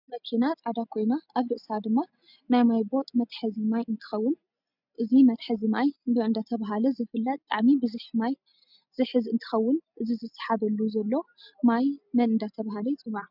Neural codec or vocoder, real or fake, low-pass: none; real; 5.4 kHz